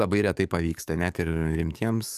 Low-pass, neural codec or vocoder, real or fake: 14.4 kHz; codec, 44.1 kHz, 7.8 kbps, DAC; fake